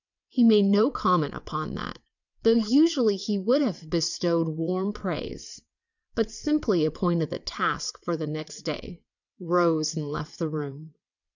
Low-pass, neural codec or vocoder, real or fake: 7.2 kHz; vocoder, 22.05 kHz, 80 mel bands, WaveNeXt; fake